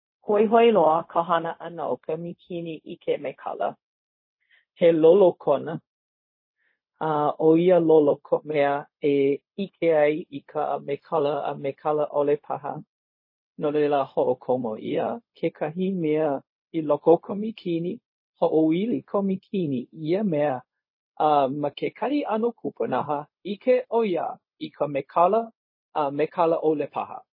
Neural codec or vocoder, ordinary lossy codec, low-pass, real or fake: codec, 16 kHz, 0.4 kbps, LongCat-Audio-Codec; MP3, 24 kbps; 5.4 kHz; fake